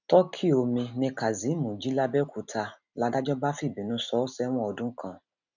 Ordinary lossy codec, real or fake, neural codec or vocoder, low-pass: none; real; none; 7.2 kHz